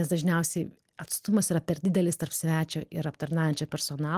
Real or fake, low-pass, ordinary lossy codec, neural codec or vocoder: real; 14.4 kHz; Opus, 24 kbps; none